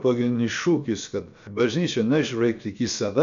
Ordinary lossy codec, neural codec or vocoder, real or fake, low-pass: MP3, 64 kbps; codec, 16 kHz, about 1 kbps, DyCAST, with the encoder's durations; fake; 7.2 kHz